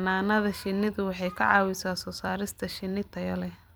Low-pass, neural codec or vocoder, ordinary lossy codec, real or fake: none; vocoder, 44.1 kHz, 128 mel bands every 256 samples, BigVGAN v2; none; fake